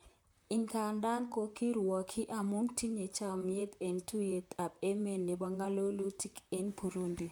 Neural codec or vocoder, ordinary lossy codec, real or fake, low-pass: vocoder, 44.1 kHz, 128 mel bands, Pupu-Vocoder; none; fake; none